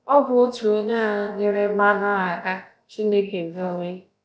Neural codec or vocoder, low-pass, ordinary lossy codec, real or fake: codec, 16 kHz, about 1 kbps, DyCAST, with the encoder's durations; none; none; fake